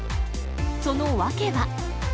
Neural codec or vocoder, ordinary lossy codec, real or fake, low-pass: none; none; real; none